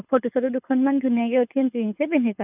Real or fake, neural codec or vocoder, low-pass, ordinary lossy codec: fake; codec, 24 kHz, 6 kbps, HILCodec; 3.6 kHz; none